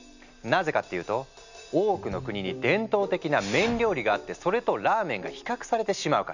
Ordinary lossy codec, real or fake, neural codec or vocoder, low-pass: none; real; none; 7.2 kHz